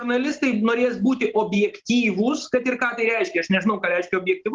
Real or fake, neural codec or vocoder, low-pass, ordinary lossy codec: real; none; 7.2 kHz; Opus, 16 kbps